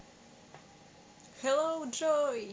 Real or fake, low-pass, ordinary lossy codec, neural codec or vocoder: real; none; none; none